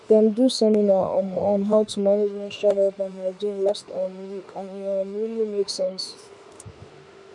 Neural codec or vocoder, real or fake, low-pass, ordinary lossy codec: autoencoder, 48 kHz, 32 numbers a frame, DAC-VAE, trained on Japanese speech; fake; 10.8 kHz; none